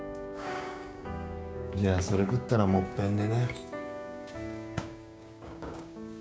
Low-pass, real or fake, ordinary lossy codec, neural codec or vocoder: none; fake; none; codec, 16 kHz, 6 kbps, DAC